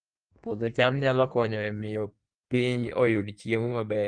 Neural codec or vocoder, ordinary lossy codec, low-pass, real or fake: codec, 16 kHz in and 24 kHz out, 1.1 kbps, FireRedTTS-2 codec; Opus, 24 kbps; 9.9 kHz; fake